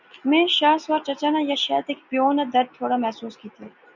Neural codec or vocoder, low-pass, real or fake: none; 7.2 kHz; real